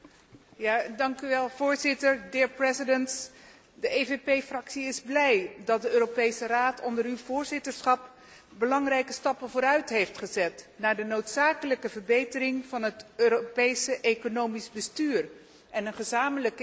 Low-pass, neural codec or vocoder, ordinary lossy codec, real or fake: none; none; none; real